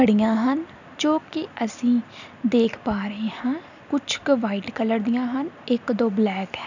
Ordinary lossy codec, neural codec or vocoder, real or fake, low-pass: none; none; real; 7.2 kHz